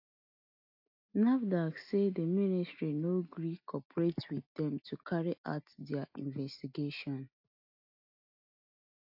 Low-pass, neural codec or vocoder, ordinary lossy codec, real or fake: 5.4 kHz; none; AAC, 48 kbps; real